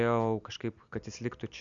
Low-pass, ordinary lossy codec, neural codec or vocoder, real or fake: 7.2 kHz; Opus, 64 kbps; none; real